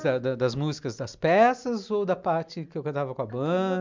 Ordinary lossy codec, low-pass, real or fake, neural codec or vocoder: MP3, 64 kbps; 7.2 kHz; real; none